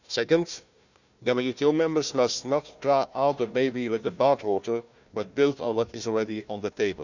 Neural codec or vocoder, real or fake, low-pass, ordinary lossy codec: codec, 16 kHz, 1 kbps, FunCodec, trained on Chinese and English, 50 frames a second; fake; 7.2 kHz; none